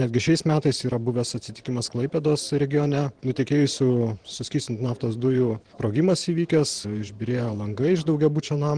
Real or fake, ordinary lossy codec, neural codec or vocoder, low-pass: fake; Opus, 16 kbps; vocoder, 44.1 kHz, 128 mel bands every 512 samples, BigVGAN v2; 9.9 kHz